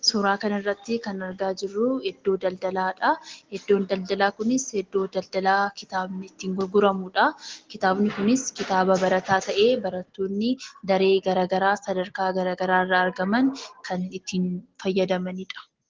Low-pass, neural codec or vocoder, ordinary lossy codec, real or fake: 7.2 kHz; none; Opus, 16 kbps; real